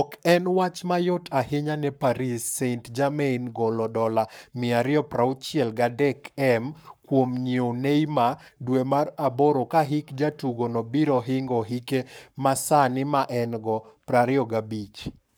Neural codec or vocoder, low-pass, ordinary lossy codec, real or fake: codec, 44.1 kHz, 7.8 kbps, Pupu-Codec; none; none; fake